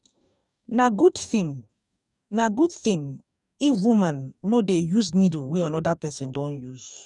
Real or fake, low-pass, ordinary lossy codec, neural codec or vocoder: fake; 10.8 kHz; none; codec, 44.1 kHz, 2.6 kbps, DAC